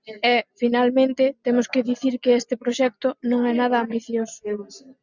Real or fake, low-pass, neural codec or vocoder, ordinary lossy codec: real; 7.2 kHz; none; Opus, 64 kbps